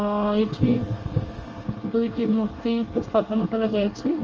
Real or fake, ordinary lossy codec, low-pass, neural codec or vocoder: fake; Opus, 24 kbps; 7.2 kHz; codec, 24 kHz, 1 kbps, SNAC